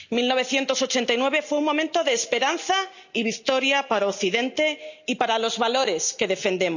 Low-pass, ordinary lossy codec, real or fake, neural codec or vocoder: 7.2 kHz; none; real; none